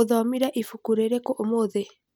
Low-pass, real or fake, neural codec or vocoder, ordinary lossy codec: none; real; none; none